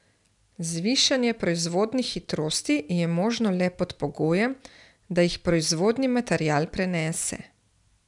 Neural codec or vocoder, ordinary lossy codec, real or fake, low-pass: none; none; real; 10.8 kHz